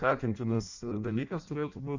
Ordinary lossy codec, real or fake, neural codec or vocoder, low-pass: Opus, 64 kbps; fake; codec, 16 kHz in and 24 kHz out, 0.6 kbps, FireRedTTS-2 codec; 7.2 kHz